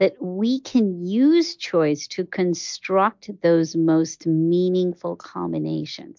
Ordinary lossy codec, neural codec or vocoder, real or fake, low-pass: MP3, 64 kbps; none; real; 7.2 kHz